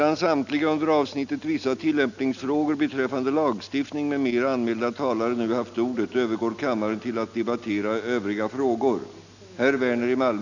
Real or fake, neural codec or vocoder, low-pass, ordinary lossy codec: real; none; 7.2 kHz; none